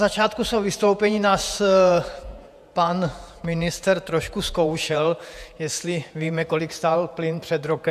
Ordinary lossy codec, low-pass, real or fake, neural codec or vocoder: AAC, 96 kbps; 14.4 kHz; fake; vocoder, 48 kHz, 128 mel bands, Vocos